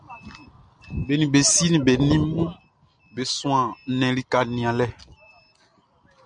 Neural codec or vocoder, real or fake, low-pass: none; real; 9.9 kHz